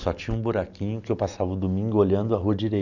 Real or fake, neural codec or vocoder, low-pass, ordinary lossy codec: real; none; 7.2 kHz; none